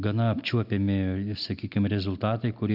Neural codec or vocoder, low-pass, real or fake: none; 5.4 kHz; real